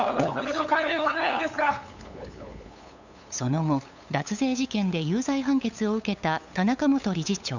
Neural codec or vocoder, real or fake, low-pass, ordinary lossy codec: codec, 16 kHz, 8 kbps, FunCodec, trained on LibriTTS, 25 frames a second; fake; 7.2 kHz; none